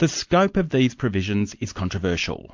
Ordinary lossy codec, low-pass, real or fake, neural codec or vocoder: MP3, 48 kbps; 7.2 kHz; real; none